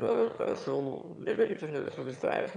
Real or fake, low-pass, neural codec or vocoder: fake; 9.9 kHz; autoencoder, 22.05 kHz, a latent of 192 numbers a frame, VITS, trained on one speaker